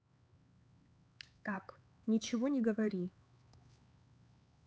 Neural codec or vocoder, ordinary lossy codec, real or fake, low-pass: codec, 16 kHz, 4 kbps, X-Codec, HuBERT features, trained on LibriSpeech; none; fake; none